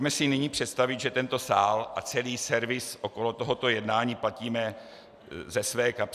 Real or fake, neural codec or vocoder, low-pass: real; none; 14.4 kHz